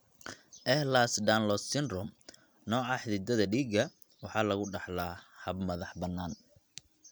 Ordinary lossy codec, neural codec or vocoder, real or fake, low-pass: none; none; real; none